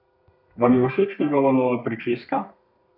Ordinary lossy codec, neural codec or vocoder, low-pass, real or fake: none; codec, 32 kHz, 1.9 kbps, SNAC; 5.4 kHz; fake